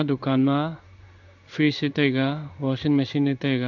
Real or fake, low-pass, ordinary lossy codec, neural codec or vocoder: fake; 7.2 kHz; none; codec, 16 kHz in and 24 kHz out, 1 kbps, XY-Tokenizer